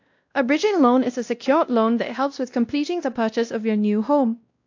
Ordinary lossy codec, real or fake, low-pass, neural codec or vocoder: AAC, 48 kbps; fake; 7.2 kHz; codec, 16 kHz, 1 kbps, X-Codec, WavLM features, trained on Multilingual LibriSpeech